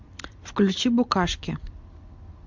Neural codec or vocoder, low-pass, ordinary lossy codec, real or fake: none; 7.2 kHz; MP3, 64 kbps; real